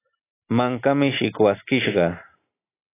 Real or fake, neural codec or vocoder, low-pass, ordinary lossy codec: real; none; 3.6 kHz; AAC, 16 kbps